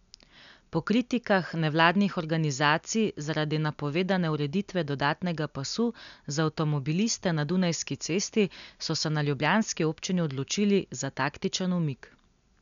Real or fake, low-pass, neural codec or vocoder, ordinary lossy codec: real; 7.2 kHz; none; none